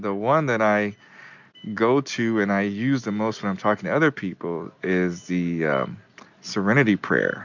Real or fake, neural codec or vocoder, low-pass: real; none; 7.2 kHz